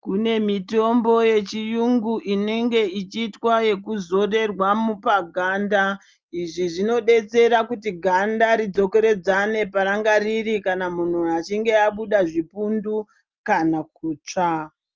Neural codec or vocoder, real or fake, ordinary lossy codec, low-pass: none; real; Opus, 24 kbps; 7.2 kHz